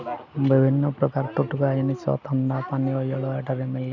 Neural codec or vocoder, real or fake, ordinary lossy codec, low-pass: none; real; none; 7.2 kHz